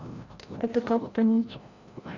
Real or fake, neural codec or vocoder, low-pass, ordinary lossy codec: fake; codec, 16 kHz, 0.5 kbps, FreqCodec, larger model; 7.2 kHz; Opus, 64 kbps